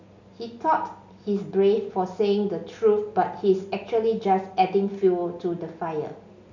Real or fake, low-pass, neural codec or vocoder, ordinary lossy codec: real; 7.2 kHz; none; none